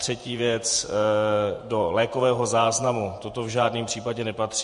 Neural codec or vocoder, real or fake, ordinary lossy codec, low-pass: vocoder, 48 kHz, 128 mel bands, Vocos; fake; MP3, 48 kbps; 14.4 kHz